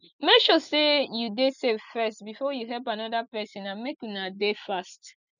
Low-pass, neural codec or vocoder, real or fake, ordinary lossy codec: 7.2 kHz; none; real; none